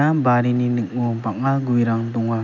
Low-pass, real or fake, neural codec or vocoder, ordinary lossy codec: 7.2 kHz; real; none; none